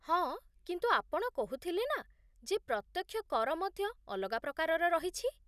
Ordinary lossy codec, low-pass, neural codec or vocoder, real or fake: none; 14.4 kHz; none; real